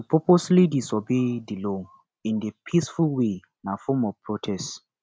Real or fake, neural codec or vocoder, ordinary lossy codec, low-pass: real; none; none; none